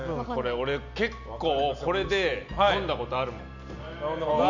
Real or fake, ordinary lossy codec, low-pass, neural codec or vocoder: real; none; 7.2 kHz; none